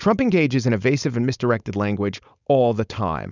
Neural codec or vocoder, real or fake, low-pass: codec, 16 kHz, 4.8 kbps, FACodec; fake; 7.2 kHz